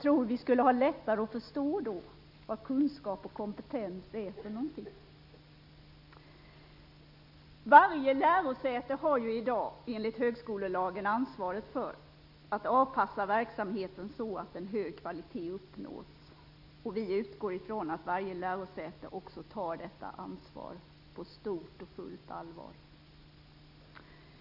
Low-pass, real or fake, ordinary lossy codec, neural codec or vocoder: 5.4 kHz; real; none; none